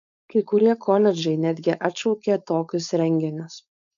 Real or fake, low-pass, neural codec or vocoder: fake; 7.2 kHz; codec, 16 kHz, 4.8 kbps, FACodec